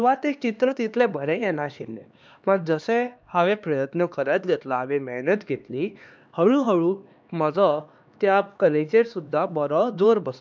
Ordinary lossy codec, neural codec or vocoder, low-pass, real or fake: none; codec, 16 kHz, 2 kbps, X-Codec, HuBERT features, trained on LibriSpeech; none; fake